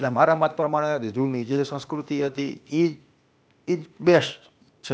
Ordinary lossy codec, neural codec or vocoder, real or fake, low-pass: none; codec, 16 kHz, 0.8 kbps, ZipCodec; fake; none